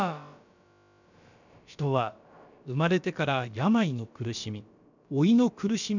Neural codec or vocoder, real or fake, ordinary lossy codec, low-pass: codec, 16 kHz, about 1 kbps, DyCAST, with the encoder's durations; fake; none; 7.2 kHz